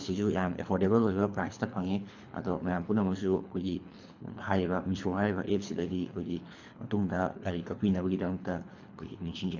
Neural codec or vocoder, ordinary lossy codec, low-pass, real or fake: codec, 24 kHz, 3 kbps, HILCodec; AAC, 48 kbps; 7.2 kHz; fake